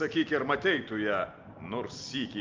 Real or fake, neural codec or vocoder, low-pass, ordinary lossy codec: real; none; 7.2 kHz; Opus, 24 kbps